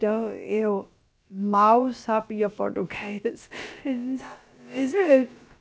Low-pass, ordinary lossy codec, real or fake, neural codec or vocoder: none; none; fake; codec, 16 kHz, about 1 kbps, DyCAST, with the encoder's durations